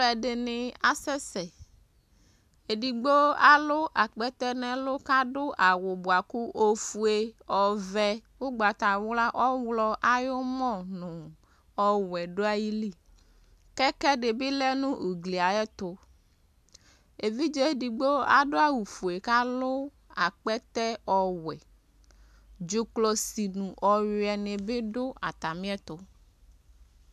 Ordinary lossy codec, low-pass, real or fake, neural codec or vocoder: AAC, 96 kbps; 14.4 kHz; real; none